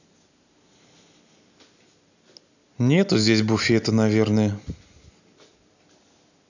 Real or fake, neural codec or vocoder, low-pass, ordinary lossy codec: real; none; 7.2 kHz; none